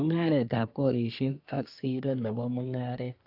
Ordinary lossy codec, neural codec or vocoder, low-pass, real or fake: AAC, 48 kbps; codec, 24 kHz, 1 kbps, SNAC; 5.4 kHz; fake